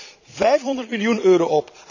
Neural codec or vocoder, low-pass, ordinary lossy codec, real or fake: vocoder, 22.05 kHz, 80 mel bands, Vocos; 7.2 kHz; none; fake